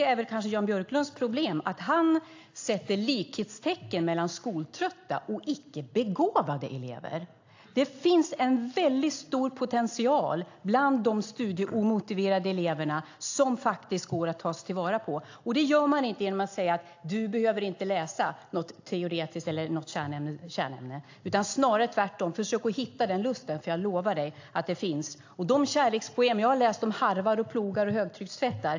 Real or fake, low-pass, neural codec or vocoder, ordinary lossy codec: real; 7.2 kHz; none; AAC, 48 kbps